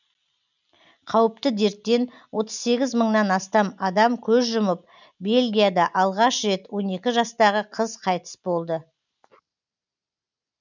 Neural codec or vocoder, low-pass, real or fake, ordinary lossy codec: none; 7.2 kHz; real; none